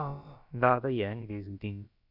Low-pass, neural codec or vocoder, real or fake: 5.4 kHz; codec, 16 kHz, about 1 kbps, DyCAST, with the encoder's durations; fake